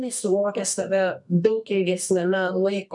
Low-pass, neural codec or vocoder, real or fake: 10.8 kHz; codec, 24 kHz, 0.9 kbps, WavTokenizer, medium music audio release; fake